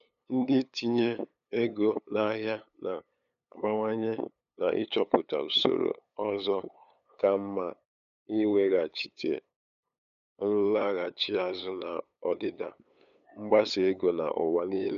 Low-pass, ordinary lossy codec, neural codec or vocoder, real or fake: 7.2 kHz; none; codec, 16 kHz, 8 kbps, FunCodec, trained on LibriTTS, 25 frames a second; fake